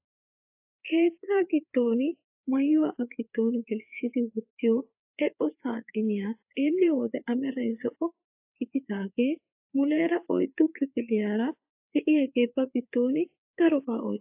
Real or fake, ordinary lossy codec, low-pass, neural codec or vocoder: fake; AAC, 32 kbps; 3.6 kHz; codec, 16 kHz, 4 kbps, FreqCodec, larger model